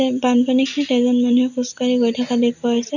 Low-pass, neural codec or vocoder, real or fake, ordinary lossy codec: 7.2 kHz; none; real; none